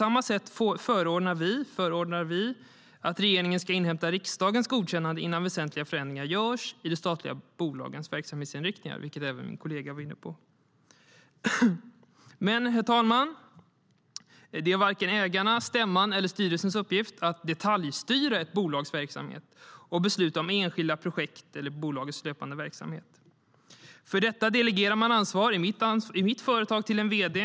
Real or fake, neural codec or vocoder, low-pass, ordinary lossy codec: real; none; none; none